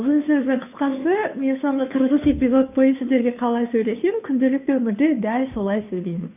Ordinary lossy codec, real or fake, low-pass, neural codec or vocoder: none; fake; 3.6 kHz; codec, 16 kHz, 2 kbps, FunCodec, trained on Chinese and English, 25 frames a second